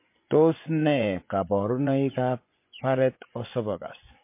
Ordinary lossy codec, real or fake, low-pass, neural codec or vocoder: MP3, 24 kbps; fake; 3.6 kHz; vocoder, 24 kHz, 100 mel bands, Vocos